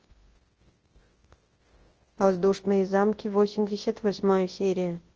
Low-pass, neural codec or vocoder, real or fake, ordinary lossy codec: 7.2 kHz; codec, 24 kHz, 0.9 kbps, WavTokenizer, large speech release; fake; Opus, 16 kbps